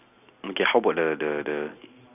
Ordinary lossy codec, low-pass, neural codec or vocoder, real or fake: none; 3.6 kHz; none; real